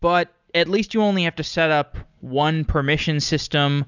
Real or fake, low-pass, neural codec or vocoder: real; 7.2 kHz; none